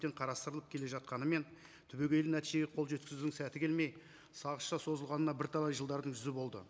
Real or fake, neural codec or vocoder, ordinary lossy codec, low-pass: real; none; none; none